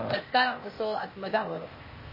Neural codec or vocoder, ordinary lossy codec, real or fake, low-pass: codec, 16 kHz, 0.8 kbps, ZipCodec; MP3, 24 kbps; fake; 5.4 kHz